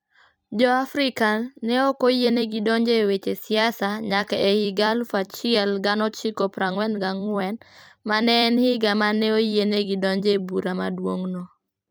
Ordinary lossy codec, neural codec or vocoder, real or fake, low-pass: none; vocoder, 44.1 kHz, 128 mel bands every 256 samples, BigVGAN v2; fake; none